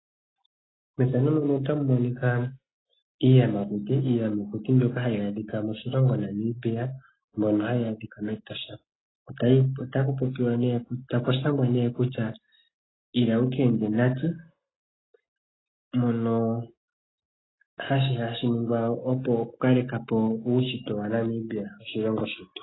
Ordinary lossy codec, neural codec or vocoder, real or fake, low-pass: AAC, 16 kbps; none; real; 7.2 kHz